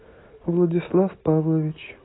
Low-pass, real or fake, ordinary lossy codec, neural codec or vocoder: 7.2 kHz; real; AAC, 16 kbps; none